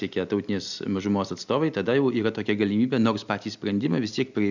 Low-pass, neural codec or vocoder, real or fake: 7.2 kHz; none; real